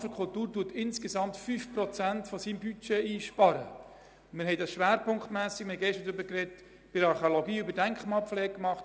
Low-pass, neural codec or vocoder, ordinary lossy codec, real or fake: none; none; none; real